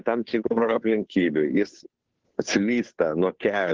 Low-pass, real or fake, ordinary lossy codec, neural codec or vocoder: 7.2 kHz; fake; Opus, 16 kbps; codec, 16 kHz, 2 kbps, FunCodec, trained on Chinese and English, 25 frames a second